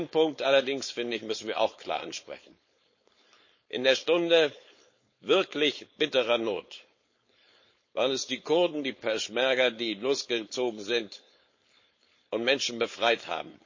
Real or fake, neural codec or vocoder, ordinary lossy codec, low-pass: fake; codec, 16 kHz, 4.8 kbps, FACodec; MP3, 32 kbps; 7.2 kHz